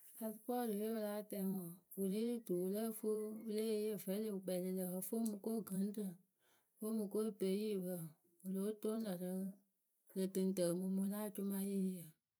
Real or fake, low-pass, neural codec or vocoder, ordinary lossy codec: fake; none; vocoder, 44.1 kHz, 128 mel bands every 512 samples, BigVGAN v2; none